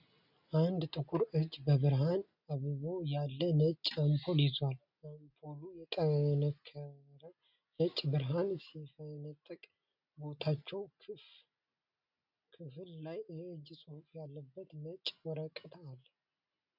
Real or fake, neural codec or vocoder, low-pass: real; none; 5.4 kHz